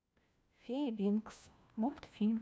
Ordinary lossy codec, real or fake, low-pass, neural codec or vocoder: none; fake; none; codec, 16 kHz, 1 kbps, FunCodec, trained on LibriTTS, 50 frames a second